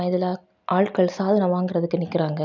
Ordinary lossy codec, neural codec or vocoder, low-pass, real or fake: none; none; 7.2 kHz; real